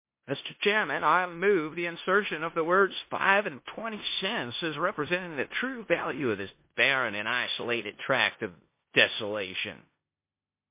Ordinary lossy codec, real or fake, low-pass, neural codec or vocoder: MP3, 24 kbps; fake; 3.6 kHz; codec, 16 kHz in and 24 kHz out, 0.9 kbps, LongCat-Audio-Codec, four codebook decoder